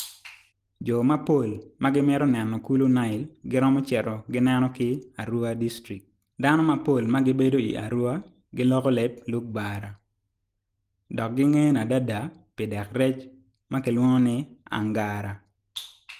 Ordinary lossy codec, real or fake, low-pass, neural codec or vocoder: Opus, 24 kbps; real; 14.4 kHz; none